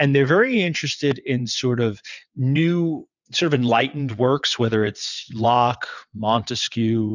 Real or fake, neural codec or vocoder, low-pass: fake; vocoder, 22.05 kHz, 80 mel bands, WaveNeXt; 7.2 kHz